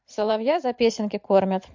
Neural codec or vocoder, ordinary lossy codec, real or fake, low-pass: vocoder, 44.1 kHz, 128 mel bands every 512 samples, BigVGAN v2; MP3, 48 kbps; fake; 7.2 kHz